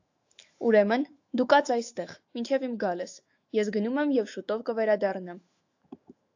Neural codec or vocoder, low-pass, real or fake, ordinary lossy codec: autoencoder, 48 kHz, 128 numbers a frame, DAC-VAE, trained on Japanese speech; 7.2 kHz; fake; AAC, 48 kbps